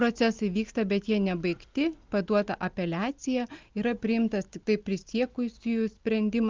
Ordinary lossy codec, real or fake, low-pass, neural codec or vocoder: Opus, 32 kbps; real; 7.2 kHz; none